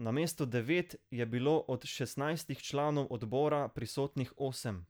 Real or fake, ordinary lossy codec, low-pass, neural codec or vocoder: real; none; none; none